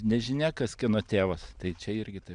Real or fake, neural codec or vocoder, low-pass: real; none; 9.9 kHz